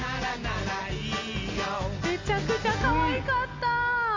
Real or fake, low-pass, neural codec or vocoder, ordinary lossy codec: real; 7.2 kHz; none; AAC, 48 kbps